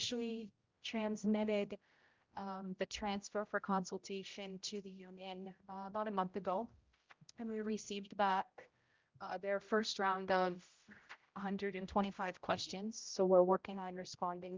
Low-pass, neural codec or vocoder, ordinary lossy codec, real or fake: 7.2 kHz; codec, 16 kHz, 0.5 kbps, X-Codec, HuBERT features, trained on general audio; Opus, 24 kbps; fake